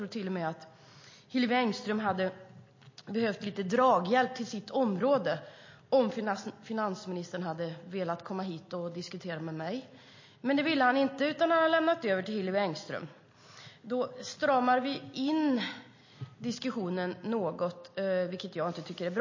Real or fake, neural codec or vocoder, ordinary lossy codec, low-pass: real; none; MP3, 32 kbps; 7.2 kHz